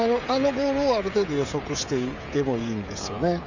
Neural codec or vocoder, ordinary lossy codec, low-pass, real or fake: codec, 16 kHz, 16 kbps, FreqCodec, smaller model; none; 7.2 kHz; fake